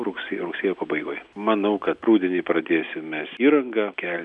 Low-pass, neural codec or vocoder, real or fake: 10.8 kHz; none; real